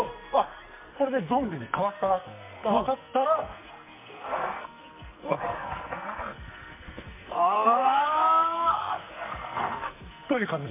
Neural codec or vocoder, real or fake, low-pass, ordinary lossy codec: codec, 44.1 kHz, 2.6 kbps, SNAC; fake; 3.6 kHz; MP3, 32 kbps